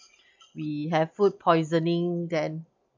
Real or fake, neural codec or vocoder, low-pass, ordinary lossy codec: real; none; 7.2 kHz; MP3, 64 kbps